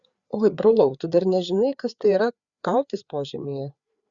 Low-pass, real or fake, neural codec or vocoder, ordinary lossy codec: 7.2 kHz; fake; codec, 16 kHz, 4 kbps, FreqCodec, larger model; Opus, 64 kbps